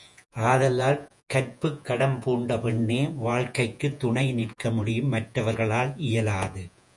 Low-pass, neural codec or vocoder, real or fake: 10.8 kHz; vocoder, 48 kHz, 128 mel bands, Vocos; fake